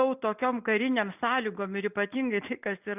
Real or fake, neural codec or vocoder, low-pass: real; none; 3.6 kHz